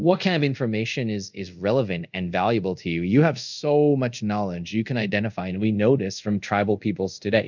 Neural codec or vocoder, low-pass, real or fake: codec, 24 kHz, 0.5 kbps, DualCodec; 7.2 kHz; fake